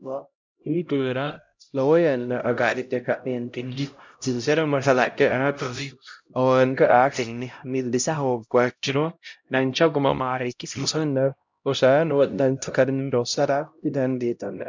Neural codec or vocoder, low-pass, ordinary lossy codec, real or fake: codec, 16 kHz, 0.5 kbps, X-Codec, HuBERT features, trained on LibriSpeech; 7.2 kHz; MP3, 64 kbps; fake